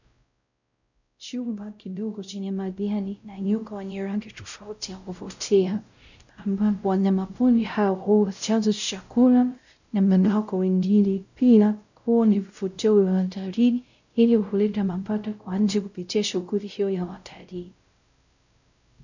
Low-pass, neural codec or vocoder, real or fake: 7.2 kHz; codec, 16 kHz, 0.5 kbps, X-Codec, WavLM features, trained on Multilingual LibriSpeech; fake